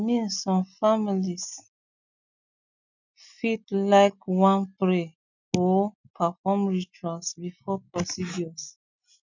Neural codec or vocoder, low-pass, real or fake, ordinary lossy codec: none; 7.2 kHz; real; none